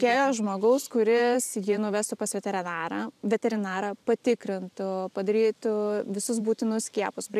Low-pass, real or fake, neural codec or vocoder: 14.4 kHz; fake; vocoder, 44.1 kHz, 128 mel bands every 512 samples, BigVGAN v2